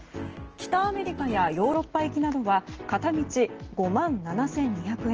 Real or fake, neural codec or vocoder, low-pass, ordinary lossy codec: real; none; 7.2 kHz; Opus, 16 kbps